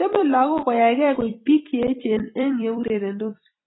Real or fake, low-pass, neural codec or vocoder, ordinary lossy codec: fake; 7.2 kHz; vocoder, 44.1 kHz, 128 mel bands every 256 samples, BigVGAN v2; AAC, 16 kbps